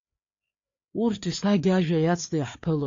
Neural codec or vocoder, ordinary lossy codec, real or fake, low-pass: codec, 16 kHz, 4 kbps, FreqCodec, larger model; AAC, 48 kbps; fake; 7.2 kHz